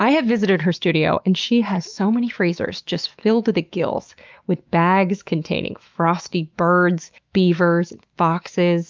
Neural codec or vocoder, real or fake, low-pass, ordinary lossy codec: autoencoder, 48 kHz, 128 numbers a frame, DAC-VAE, trained on Japanese speech; fake; 7.2 kHz; Opus, 24 kbps